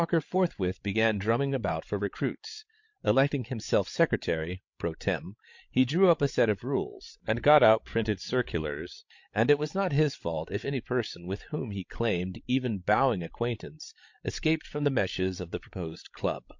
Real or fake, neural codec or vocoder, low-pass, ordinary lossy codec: fake; codec, 16 kHz, 16 kbps, FreqCodec, larger model; 7.2 kHz; MP3, 48 kbps